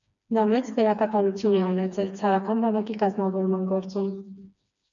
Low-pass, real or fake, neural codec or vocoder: 7.2 kHz; fake; codec, 16 kHz, 2 kbps, FreqCodec, smaller model